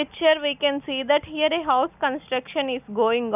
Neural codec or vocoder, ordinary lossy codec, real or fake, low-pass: none; none; real; 3.6 kHz